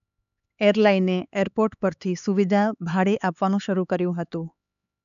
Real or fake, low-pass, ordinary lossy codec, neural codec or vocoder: fake; 7.2 kHz; none; codec, 16 kHz, 4 kbps, X-Codec, HuBERT features, trained on LibriSpeech